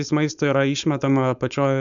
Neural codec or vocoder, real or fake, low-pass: codec, 16 kHz, 4.8 kbps, FACodec; fake; 7.2 kHz